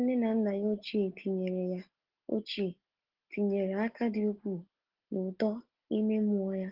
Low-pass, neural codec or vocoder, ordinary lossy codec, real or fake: 5.4 kHz; none; Opus, 16 kbps; real